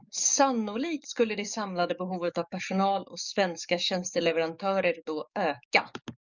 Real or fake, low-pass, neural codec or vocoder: fake; 7.2 kHz; codec, 44.1 kHz, 7.8 kbps, DAC